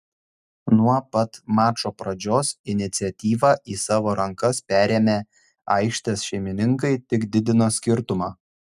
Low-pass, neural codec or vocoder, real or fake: 14.4 kHz; none; real